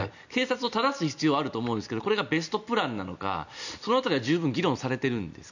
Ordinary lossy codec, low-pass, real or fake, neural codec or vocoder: none; 7.2 kHz; real; none